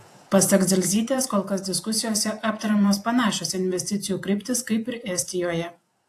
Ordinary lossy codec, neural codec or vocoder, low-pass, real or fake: AAC, 64 kbps; vocoder, 48 kHz, 128 mel bands, Vocos; 14.4 kHz; fake